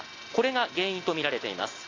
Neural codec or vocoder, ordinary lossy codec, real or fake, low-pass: none; AAC, 32 kbps; real; 7.2 kHz